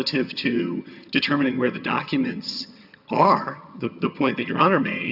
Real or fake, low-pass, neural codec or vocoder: fake; 5.4 kHz; vocoder, 22.05 kHz, 80 mel bands, HiFi-GAN